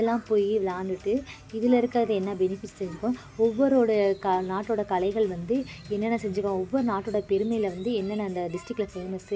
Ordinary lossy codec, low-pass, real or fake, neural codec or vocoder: none; none; real; none